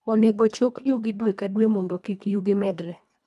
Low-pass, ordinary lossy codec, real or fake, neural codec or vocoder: none; none; fake; codec, 24 kHz, 1.5 kbps, HILCodec